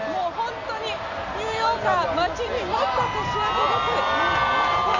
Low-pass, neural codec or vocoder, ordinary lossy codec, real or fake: 7.2 kHz; none; Opus, 64 kbps; real